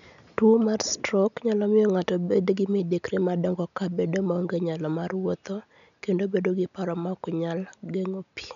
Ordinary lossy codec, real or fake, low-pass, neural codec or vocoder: none; real; 7.2 kHz; none